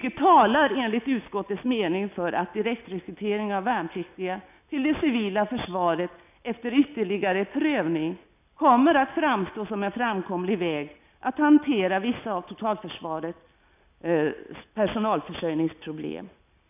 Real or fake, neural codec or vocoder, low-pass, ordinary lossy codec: real; none; 3.6 kHz; none